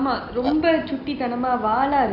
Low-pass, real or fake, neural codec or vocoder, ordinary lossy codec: 5.4 kHz; real; none; none